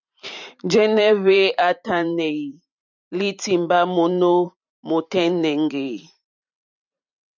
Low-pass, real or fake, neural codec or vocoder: 7.2 kHz; fake; vocoder, 44.1 kHz, 128 mel bands every 512 samples, BigVGAN v2